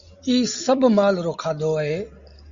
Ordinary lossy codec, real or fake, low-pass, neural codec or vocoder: Opus, 64 kbps; real; 7.2 kHz; none